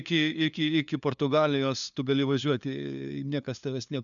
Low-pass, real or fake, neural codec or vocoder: 7.2 kHz; fake; codec, 16 kHz, 2 kbps, FunCodec, trained on LibriTTS, 25 frames a second